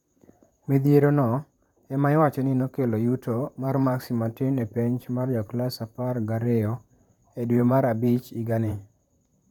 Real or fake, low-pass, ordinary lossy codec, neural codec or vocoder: fake; 19.8 kHz; none; vocoder, 44.1 kHz, 128 mel bands, Pupu-Vocoder